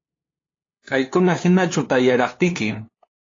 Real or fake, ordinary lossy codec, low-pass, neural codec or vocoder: fake; AAC, 32 kbps; 7.2 kHz; codec, 16 kHz, 2 kbps, FunCodec, trained on LibriTTS, 25 frames a second